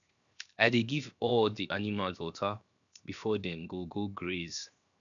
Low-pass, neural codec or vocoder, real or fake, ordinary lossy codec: 7.2 kHz; codec, 16 kHz, 0.7 kbps, FocalCodec; fake; none